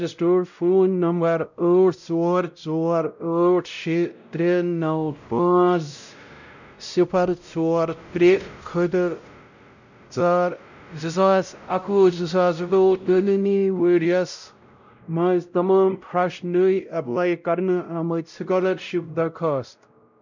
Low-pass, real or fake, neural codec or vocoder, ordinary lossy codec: 7.2 kHz; fake; codec, 16 kHz, 0.5 kbps, X-Codec, WavLM features, trained on Multilingual LibriSpeech; none